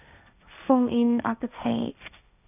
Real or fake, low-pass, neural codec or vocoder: fake; 3.6 kHz; codec, 16 kHz, 1.1 kbps, Voila-Tokenizer